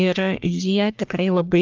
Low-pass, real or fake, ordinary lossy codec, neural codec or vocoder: 7.2 kHz; fake; Opus, 24 kbps; codec, 44.1 kHz, 1.7 kbps, Pupu-Codec